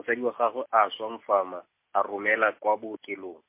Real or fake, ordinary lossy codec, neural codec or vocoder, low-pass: real; MP3, 24 kbps; none; 3.6 kHz